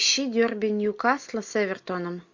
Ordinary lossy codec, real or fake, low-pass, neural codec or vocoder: MP3, 48 kbps; real; 7.2 kHz; none